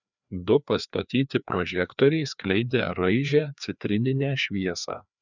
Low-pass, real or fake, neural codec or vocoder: 7.2 kHz; fake; codec, 16 kHz, 2 kbps, FreqCodec, larger model